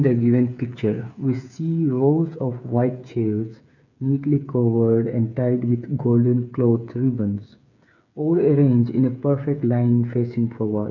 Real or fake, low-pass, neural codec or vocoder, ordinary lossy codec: fake; 7.2 kHz; codec, 16 kHz, 8 kbps, FreqCodec, smaller model; none